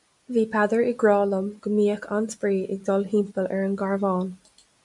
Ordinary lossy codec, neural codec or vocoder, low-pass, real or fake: MP3, 96 kbps; none; 10.8 kHz; real